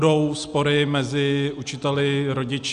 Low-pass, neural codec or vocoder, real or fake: 10.8 kHz; none; real